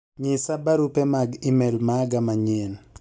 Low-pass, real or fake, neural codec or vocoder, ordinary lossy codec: none; real; none; none